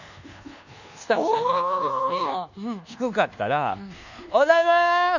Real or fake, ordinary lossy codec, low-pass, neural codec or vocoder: fake; none; 7.2 kHz; codec, 24 kHz, 1.2 kbps, DualCodec